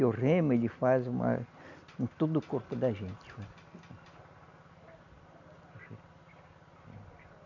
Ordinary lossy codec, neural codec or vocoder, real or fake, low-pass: none; none; real; 7.2 kHz